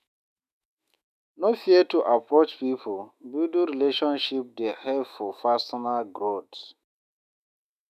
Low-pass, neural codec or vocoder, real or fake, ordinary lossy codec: 14.4 kHz; autoencoder, 48 kHz, 128 numbers a frame, DAC-VAE, trained on Japanese speech; fake; none